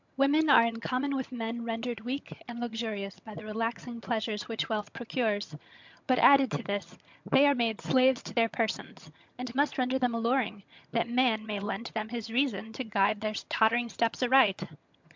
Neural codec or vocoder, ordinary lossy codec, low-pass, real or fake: vocoder, 22.05 kHz, 80 mel bands, HiFi-GAN; MP3, 64 kbps; 7.2 kHz; fake